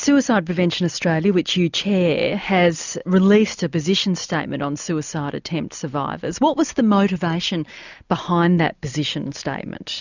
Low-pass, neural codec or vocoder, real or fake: 7.2 kHz; none; real